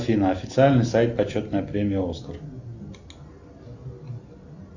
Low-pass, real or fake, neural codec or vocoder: 7.2 kHz; real; none